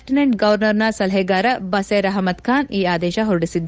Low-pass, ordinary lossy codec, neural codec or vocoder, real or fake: none; none; codec, 16 kHz, 8 kbps, FunCodec, trained on Chinese and English, 25 frames a second; fake